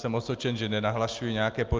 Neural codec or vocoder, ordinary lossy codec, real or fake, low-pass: none; Opus, 24 kbps; real; 7.2 kHz